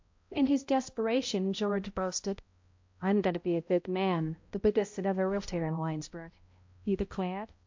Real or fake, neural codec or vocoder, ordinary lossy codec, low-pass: fake; codec, 16 kHz, 0.5 kbps, X-Codec, HuBERT features, trained on balanced general audio; MP3, 48 kbps; 7.2 kHz